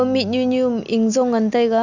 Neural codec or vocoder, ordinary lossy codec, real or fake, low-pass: none; none; real; 7.2 kHz